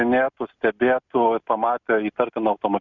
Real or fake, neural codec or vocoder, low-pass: real; none; 7.2 kHz